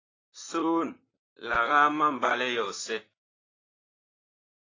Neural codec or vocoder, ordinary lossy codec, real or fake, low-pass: vocoder, 44.1 kHz, 128 mel bands, Pupu-Vocoder; AAC, 32 kbps; fake; 7.2 kHz